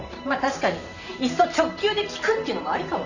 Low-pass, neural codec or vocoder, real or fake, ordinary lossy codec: 7.2 kHz; vocoder, 44.1 kHz, 128 mel bands every 512 samples, BigVGAN v2; fake; none